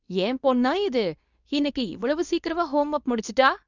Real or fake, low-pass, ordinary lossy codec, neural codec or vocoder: fake; 7.2 kHz; none; codec, 24 kHz, 0.9 kbps, WavTokenizer, medium speech release version 2